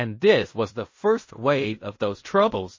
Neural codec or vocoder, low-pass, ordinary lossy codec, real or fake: codec, 16 kHz in and 24 kHz out, 0.4 kbps, LongCat-Audio-Codec, fine tuned four codebook decoder; 7.2 kHz; MP3, 32 kbps; fake